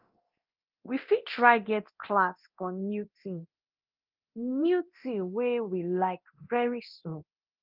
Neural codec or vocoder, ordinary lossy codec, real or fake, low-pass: codec, 24 kHz, 0.9 kbps, DualCodec; Opus, 32 kbps; fake; 5.4 kHz